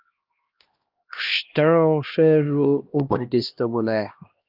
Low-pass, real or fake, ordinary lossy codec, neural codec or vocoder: 5.4 kHz; fake; Opus, 32 kbps; codec, 16 kHz, 1 kbps, X-Codec, HuBERT features, trained on LibriSpeech